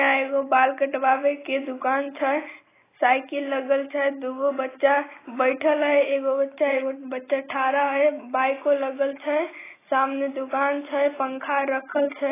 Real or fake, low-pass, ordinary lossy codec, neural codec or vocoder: real; 3.6 kHz; AAC, 16 kbps; none